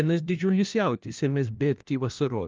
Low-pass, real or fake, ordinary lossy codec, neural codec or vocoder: 7.2 kHz; fake; Opus, 24 kbps; codec, 16 kHz, 1 kbps, FunCodec, trained on LibriTTS, 50 frames a second